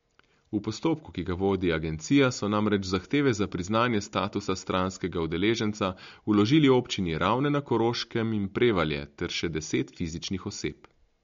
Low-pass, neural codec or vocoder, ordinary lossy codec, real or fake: 7.2 kHz; none; MP3, 48 kbps; real